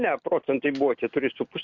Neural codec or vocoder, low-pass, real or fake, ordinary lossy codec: none; 7.2 kHz; real; MP3, 64 kbps